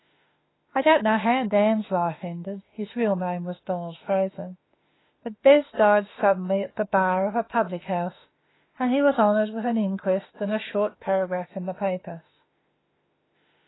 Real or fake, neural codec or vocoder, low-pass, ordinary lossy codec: fake; autoencoder, 48 kHz, 32 numbers a frame, DAC-VAE, trained on Japanese speech; 7.2 kHz; AAC, 16 kbps